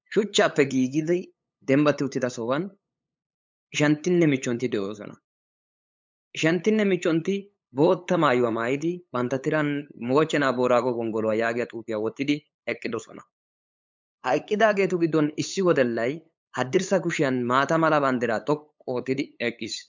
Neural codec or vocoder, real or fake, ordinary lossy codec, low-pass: codec, 16 kHz, 8 kbps, FunCodec, trained on LibriTTS, 25 frames a second; fake; MP3, 64 kbps; 7.2 kHz